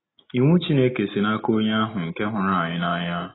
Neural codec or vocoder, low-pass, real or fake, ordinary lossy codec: none; 7.2 kHz; real; AAC, 16 kbps